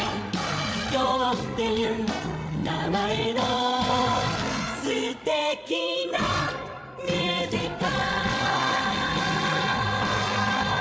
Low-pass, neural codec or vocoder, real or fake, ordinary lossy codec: none; codec, 16 kHz, 8 kbps, FreqCodec, larger model; fake; none